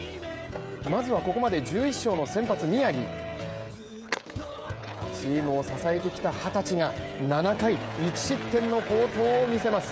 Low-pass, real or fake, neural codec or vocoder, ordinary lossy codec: none; fake; codec, 16 kHz, 16 kbps, FreqCodec, smaller model; none